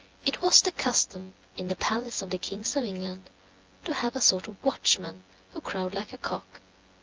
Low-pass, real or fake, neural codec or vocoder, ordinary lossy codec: 7.2 kHz; fake; vocoder, 24 kHz, 100 mel bands, Vocos; Opus, 24 kbps